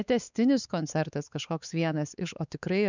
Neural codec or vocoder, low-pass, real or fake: codec, 16 kHz, 4 kbps, X-Codec, WavLM features, trained on Multilingual LibriSpeech; 7.2 kHz; fake